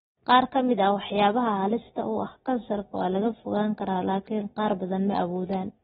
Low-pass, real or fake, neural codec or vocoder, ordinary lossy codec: 19.8 kHz; real; none; AAC, 16 kbps